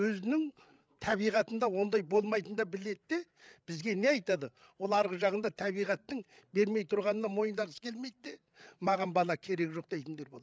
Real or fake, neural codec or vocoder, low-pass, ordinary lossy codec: fake; codec, 16 kHz, 8 kbps, FreqCodec, larger model; none; none